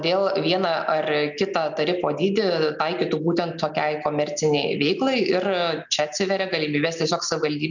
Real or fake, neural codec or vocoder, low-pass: real; none; 7.2 kHz